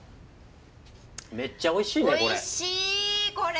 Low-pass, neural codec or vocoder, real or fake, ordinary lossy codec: none; none; real; none